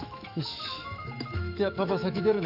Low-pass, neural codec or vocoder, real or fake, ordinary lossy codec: 5.4 kHz; autoencoder, 48 kHz, 128 numbers a frame, DAC-VAE, trained on Japanese speech; fake; none